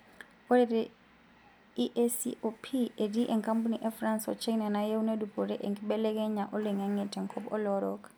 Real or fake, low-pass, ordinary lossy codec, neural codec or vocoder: real; none; none; none